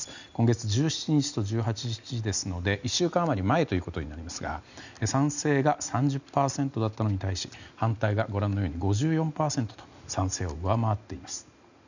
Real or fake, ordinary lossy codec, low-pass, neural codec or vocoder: real; none; 7.2 kHz; none